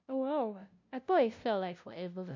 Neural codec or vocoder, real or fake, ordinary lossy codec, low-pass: codec, 16 kHz, 0.5 kbps, FunCodec, trained on LibriTTS, 25 frames a second; fake; MP3, 64 kbps; 7.2 kHz